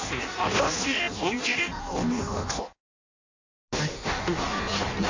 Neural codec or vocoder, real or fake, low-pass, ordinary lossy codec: codec, 16 kHz in and 24 kHz out, 0.6 kbps, FireRedTTS-2 codec; fake; 7.2 kHz; AAC, 48 kbps